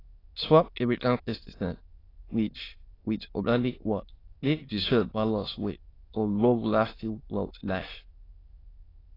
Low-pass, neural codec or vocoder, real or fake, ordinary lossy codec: 5.4 kHz; autoencoder, 22.05 kHz, a latent of 192 numbers a frame, VITS, trained on many speakers; fake; AAC, 24 kbps